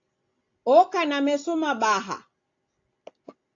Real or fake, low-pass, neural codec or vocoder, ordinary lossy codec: real; 7.2 kHz; none; AAC, 48 kbps